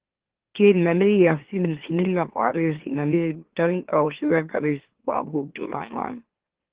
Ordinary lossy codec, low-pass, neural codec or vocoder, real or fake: Opus, 16 kbps; 3.6 kHz; autoencoder, 44.1 kHz, a latent of 192 numbers a frame, MeloTTS; fake